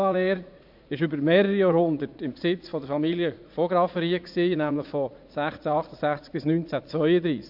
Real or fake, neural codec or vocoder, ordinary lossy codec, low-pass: fake; vocoder, 44.1 kHz, 80 mel bands, Vocos; MP3, 48 kbps; 5.4 kHz